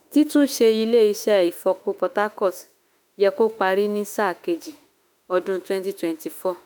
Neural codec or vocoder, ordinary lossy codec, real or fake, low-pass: autoencoder, 48 kHz, 32 numbers a frame, DAC-VAE, trained on Japanese speech; none; fake; none